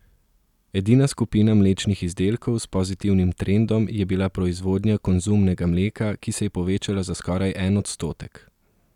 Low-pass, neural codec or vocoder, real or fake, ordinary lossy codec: 19.8 kHz; none; real; none